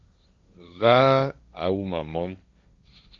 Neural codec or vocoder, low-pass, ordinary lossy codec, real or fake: codec, 16 kHz, 1.1 kbps, Voila-Tokenizer; 7.2 kHz; Opus, 64 kbps; fake